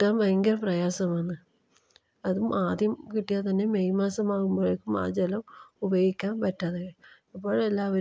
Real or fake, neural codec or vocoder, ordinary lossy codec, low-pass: real; none; none; none